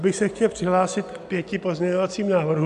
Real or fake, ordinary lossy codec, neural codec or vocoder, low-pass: real; AAC, 96 kbps; none; 10.8 kHz